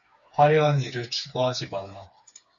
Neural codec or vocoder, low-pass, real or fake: codec, 16 kHz, 4 kbps, FreqCodec, smaller model; 7.2 kHz; fake